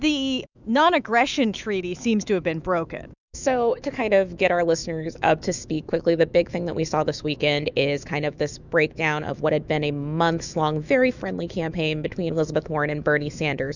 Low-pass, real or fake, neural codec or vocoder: 7.2 kHz; fake; autoencoder, 48 kHz, 128 numbers a frame, DAC-VAE, trained on Japanese speech